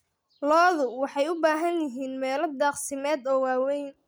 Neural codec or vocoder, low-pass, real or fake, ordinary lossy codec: none; none; real; none